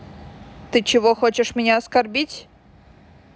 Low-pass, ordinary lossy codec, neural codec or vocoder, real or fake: none; none; none; real